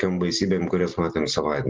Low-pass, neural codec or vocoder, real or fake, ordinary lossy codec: 7.2 kHz; none; real; Opus, 16 kbps